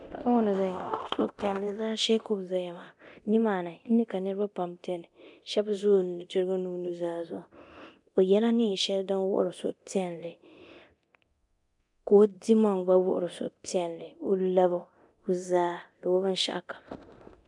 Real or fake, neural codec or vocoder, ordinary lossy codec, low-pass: fake; codec, 24 kHz, 0.9 kbps, DualCodec; MP3, 96 kbps; 10.8 kHz